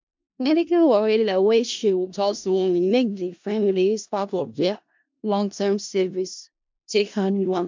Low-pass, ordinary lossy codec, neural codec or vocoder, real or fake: 7.2 kHz; MP3, 64 kbps; codec, 16 kHz in and 24 kHz out, 0.4 kbps, LongCat-Audio-Codec, four codebook decoder; fake